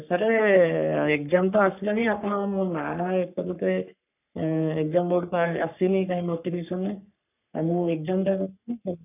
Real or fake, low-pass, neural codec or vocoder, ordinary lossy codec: fake; 3.6 kHz; codec, 44.1 kHz, 3.4 kbps, Pupu-Codec; none